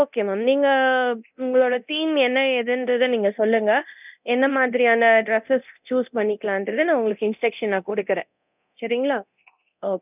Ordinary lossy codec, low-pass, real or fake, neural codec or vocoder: none; 3.6 kHz; fake; codec, 24 kHz, 0.9 kbps, DualCodec